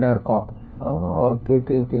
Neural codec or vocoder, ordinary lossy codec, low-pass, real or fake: codec, 16 kHz, 1 kbps, FunCodec, trained on LibriTTS, 50 frames a second; none; none; fake